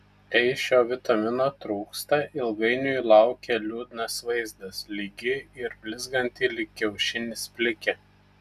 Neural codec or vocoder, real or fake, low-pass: none; real; 14.4 kHz